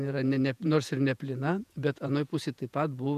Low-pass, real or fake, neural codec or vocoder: 14.4 kHz; fake; vocoder, 48 kHz, 128 mel bands, Vocos